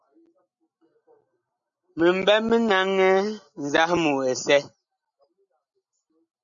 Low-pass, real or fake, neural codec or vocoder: 7.2 kHz; real; none